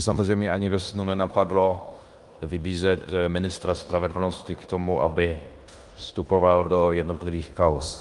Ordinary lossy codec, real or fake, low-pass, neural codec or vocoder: Opus, 32 kbps; fake; 10.8 kHz; codec, 16 kHz in and 24 kHz out, 0.9 kbps, LongCat-Audio-Codec, fine tuned four codebook decoder